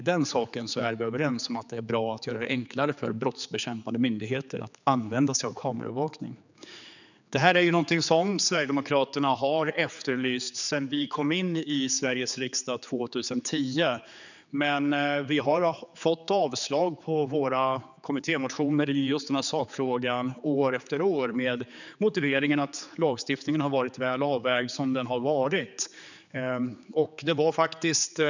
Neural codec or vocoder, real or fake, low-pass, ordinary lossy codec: codec, 16 kHz, 4 kbps, X-Codec, HuBERT features, trained on general audio; fake; 7.2 kHz; none